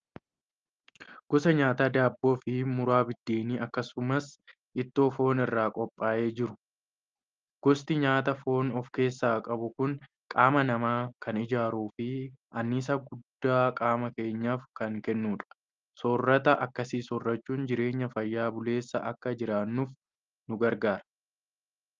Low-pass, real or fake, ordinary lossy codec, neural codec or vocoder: 7.2 kHz; real; Opus, 24 kbps; none